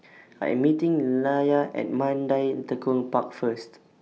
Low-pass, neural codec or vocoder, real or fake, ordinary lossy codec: none; none; real; none